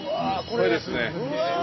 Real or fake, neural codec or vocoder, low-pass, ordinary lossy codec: real; none; 7.2 kHz; MP3, 24 kbps